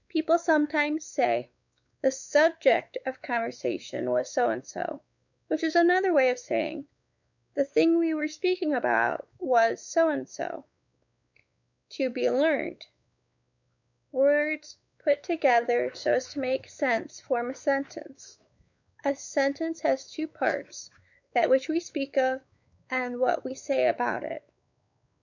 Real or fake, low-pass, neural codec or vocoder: fake; 7.2 kHz; codec, 16 kHz, 4 kbps, X-Codec, WavLM features, trained on Multilingual LibriSpeech